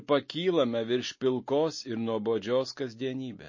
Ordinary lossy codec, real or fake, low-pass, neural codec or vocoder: MP3, 32 kbps; real; 7.2 kHz; none